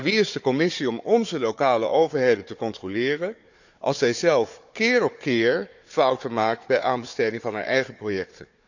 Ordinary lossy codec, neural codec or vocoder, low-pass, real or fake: none; codec, 16 kHz, 4 kbps, FunCodec, trained on Chinese and English, 50 frames a second; 7.2 kHz; fake